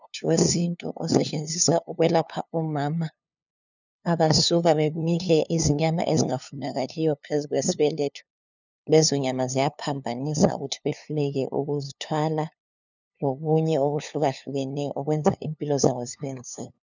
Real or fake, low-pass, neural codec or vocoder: fake; 7.2 kHz; codec, 16 kHz, 2 kbps, FunCodec, trained on LibriTTS, 25 frames a second